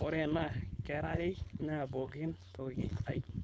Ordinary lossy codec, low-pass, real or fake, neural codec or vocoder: none; none; fake; codec, 16 kHz, 4.8 kbps, FACodec